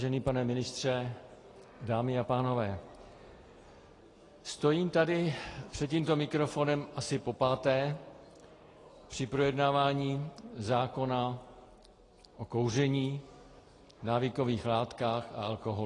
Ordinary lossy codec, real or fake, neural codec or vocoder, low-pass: AAC, 32 kbps; real; none; 10.8 kHz